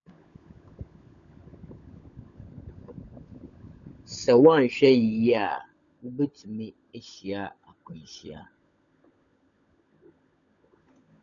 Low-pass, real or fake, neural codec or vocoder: 7.2 kHz; fake; codec, 16 kHz, 16 kbps, FunCodec, trained on LibriTTS, 50 frames a second